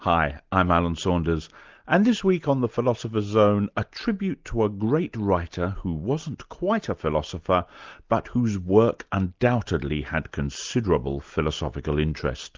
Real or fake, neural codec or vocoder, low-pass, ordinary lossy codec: real; none; 7.2 kHz; Opus, 32 kbps